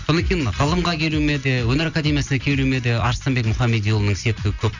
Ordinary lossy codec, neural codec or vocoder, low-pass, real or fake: none; none; 7.2 kHz; real